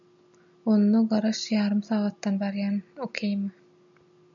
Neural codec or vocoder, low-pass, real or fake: none; 7.2 kHz; real